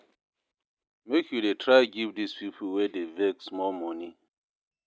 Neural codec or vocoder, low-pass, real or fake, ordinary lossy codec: none; none; real; none